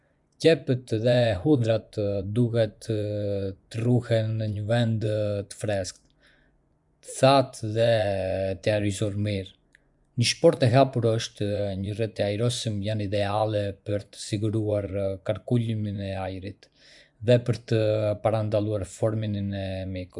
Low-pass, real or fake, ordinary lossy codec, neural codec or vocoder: 10.8 kHz; fake; none; vocoder, 24 kHz, 100 mel bands, Vocos